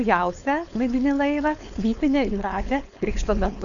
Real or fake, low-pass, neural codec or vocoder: fake; 7.2 kHz; codec, 16 kHz, 4.8 kbps, FACodec